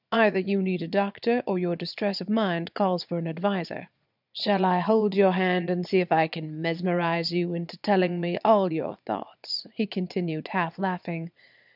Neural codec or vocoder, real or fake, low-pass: vocoder, 22.05 kHz, 80 mel bands, Vocos; fake; 5.4 kHz